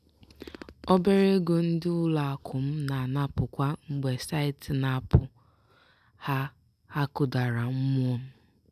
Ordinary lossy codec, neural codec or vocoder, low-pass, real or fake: none; none; 14.4 kHz; real